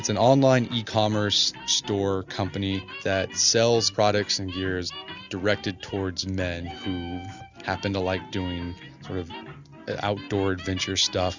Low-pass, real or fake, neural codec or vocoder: 7.2 kHz; real; none